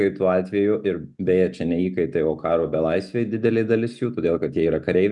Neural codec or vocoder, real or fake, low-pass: none; real; 10.8 kHz